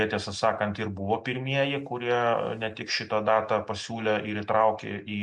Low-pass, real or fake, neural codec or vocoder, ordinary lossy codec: 10.8 kHz; real; none; MP3, 64 kbps